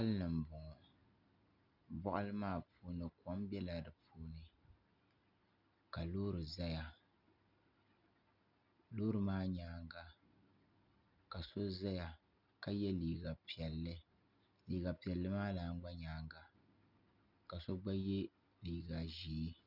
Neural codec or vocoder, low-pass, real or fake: none; 5.4 kHz; real